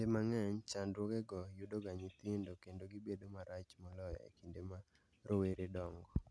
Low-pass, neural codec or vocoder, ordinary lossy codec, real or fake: none; none; none; real